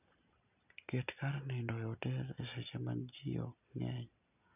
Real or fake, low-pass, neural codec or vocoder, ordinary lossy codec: real; 3.6 kHz; none; none